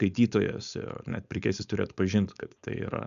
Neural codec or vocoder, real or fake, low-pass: codec, 16 kHz, 4.8 kbps, FACodec; fake; 7.2 kHz